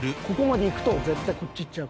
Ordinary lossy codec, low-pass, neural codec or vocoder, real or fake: none; none; none; real